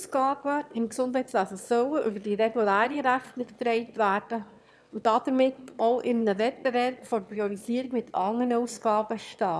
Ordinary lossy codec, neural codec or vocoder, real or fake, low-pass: none; autoencoder, 22.05 kHz, a latent of 192 numbers a frame, VITS, trained on one speaker; fake; none